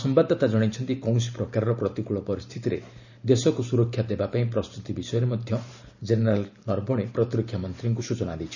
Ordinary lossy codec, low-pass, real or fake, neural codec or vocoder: none; 7.2 kHz; fake; vocoder, 44.1 kHz, 128 mel bands every 512 samples, BigVGAN v2